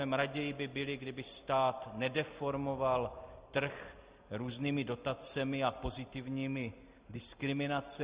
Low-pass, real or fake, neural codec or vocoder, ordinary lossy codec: 3.6 kHz; real; none; Opus, 64 kbps